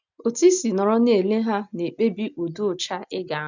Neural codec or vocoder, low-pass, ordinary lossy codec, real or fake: none; 7.2 kHz; none; real